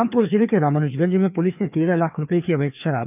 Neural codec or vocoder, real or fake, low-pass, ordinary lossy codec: codec, 16 kHz, 2 kbps, FreqCodec, larger model; fake; 3.6 kHz; none